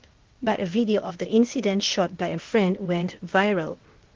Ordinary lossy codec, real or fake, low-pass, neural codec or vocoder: Opus, 16 kbps; fake; 7.2 kHz; codec, 16 kHz, 0.8 kbps, ZipCodec